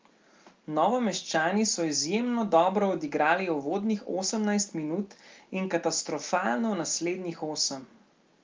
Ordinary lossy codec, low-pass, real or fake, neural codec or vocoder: Opus, 24 kbps; 7.2 kHz; real; none